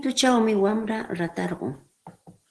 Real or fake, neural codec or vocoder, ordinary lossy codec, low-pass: real; none; Opus, 16 kbps; 10.8 kHz